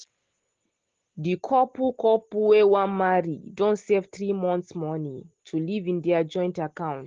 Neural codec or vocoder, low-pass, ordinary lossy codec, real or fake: none; 7.2 kHz; Opus, 16 kbps; real